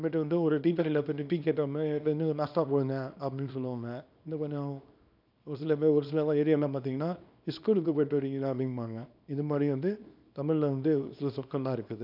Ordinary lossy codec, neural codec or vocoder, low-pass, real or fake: none; codec, 24 kHz, 0.9 kbps, WavTokenizer, small release; 5.4 kHz; fake